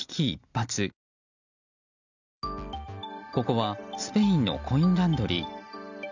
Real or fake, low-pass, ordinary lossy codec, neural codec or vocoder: real; 7.2 kHz; none; none